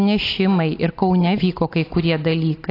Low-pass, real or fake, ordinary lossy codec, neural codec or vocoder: 5.4 kHz; real; AAC, 32 kbps; none